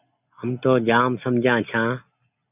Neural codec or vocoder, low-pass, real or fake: none; 3.6 kHz; real